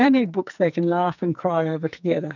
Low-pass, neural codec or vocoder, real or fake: 7.2 kHz; codec, 44.1 kHz, 2.6 kbps, SNAC; fake